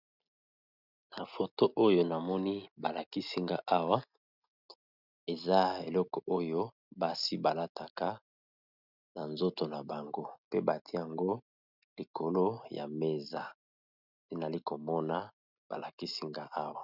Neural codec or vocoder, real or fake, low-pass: none; real; 5.4 kHz